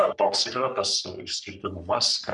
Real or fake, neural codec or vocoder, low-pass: fake; codec, 44.1 kHz, 3.4 kbps, Pupu-Codec; 10.8 kHz